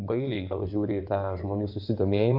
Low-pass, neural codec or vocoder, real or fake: 5.4 kHz; codec, 16 kHz in and 24 kHz out, 2.2 kbps, FireRedTTS-2 codec; fake